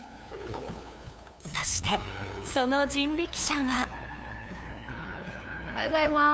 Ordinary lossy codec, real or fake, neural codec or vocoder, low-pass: none; fake; codec, 16 kHz, 2 kbps, FunCodec, trained on LibriTTS, 25 frames a second; none